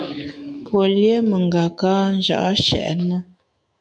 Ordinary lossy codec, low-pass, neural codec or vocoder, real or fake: MP3, 96 kbps; 9.9 kHz; autoencoder, 48 kHz, 128 numbers a frame, DAC-VAE, trained on Japanese speech; fake